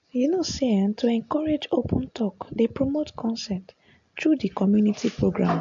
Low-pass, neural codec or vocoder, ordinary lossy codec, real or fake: 7.2 kHz; none; AAC, 64 kbps; real